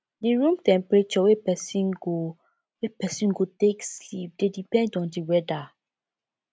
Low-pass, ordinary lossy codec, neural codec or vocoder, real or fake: none; none; none; real